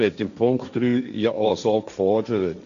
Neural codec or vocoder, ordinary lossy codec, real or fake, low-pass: codec, 16 kHz, 1.1 kbps, Voila-Tokenizer; none; fake; 7.2 kHz